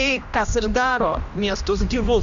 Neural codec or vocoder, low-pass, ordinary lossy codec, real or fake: codec, 16 kHz, 1 kbps, X-Codec, HuBERT features, trained on general audio; 7.2 kHz; MP3, 96 kbps; fake